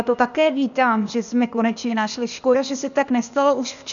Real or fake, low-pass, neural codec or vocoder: fake; 7.2 kHz; codec, 16 kHz, 0.8 kbps, ZipCodec